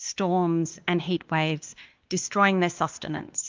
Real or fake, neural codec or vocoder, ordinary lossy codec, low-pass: fake; codec, 16 kHz, 2 kbps, X-Codec, WavLM features, trained on Multilingual LibriSpeech; Opus, 32 kbps; 7.2 kHz